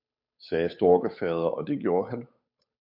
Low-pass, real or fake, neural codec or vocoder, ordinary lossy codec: 5.4 kHz; fake; codec, 16 kHz, 8 kbps, FunCodec, trained on Chinese and English, 25 frames a second; MP3, 48 kbps